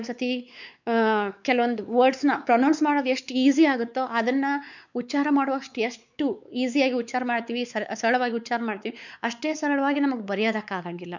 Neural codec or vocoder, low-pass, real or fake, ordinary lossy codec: codec, 16 kHz, 4 kbps, X-Codec, WavLM features, trained on Multilingual LibriSpeech; 7.2 kHz; fake; none